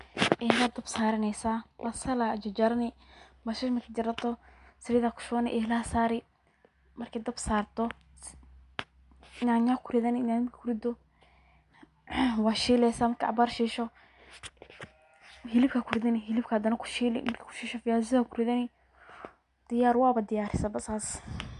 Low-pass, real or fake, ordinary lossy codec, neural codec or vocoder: 10.8 kHz; real; MP3, 64 kbps; none